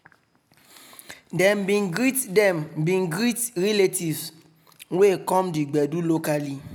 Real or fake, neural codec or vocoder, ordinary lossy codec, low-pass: real; none; none; none